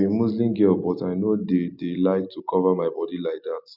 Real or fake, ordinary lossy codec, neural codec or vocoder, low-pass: real; none; none; 5.4 kHz